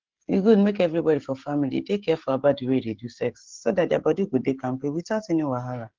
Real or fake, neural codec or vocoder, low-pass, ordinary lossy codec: fake; codec, 16 kHz, 16 kbps, FreqCodec, smaller model; 7.2 kHz; Opus, 16 kbps